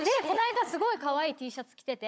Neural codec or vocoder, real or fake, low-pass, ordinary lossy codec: codec, 16 kHz, 16 kbps, FunCodec, trained on Chinese and English, 50 frames a second; fake; none; none